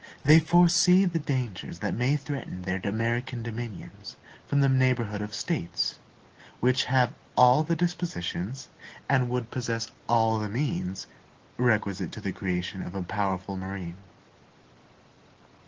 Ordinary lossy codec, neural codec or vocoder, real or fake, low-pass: Opus, 16 kbps; none; real; 7.2 kHz